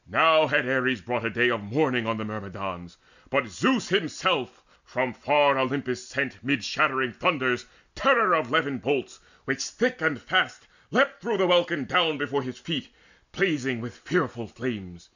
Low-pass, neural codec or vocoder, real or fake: 7.2 kHz; none; real